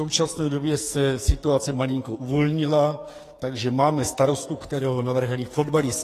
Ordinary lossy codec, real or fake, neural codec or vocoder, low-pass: AAC, 48 kbps; fake; codec, 44.1 kHz, 2.6 kbps, SNAC; 14.4 kHz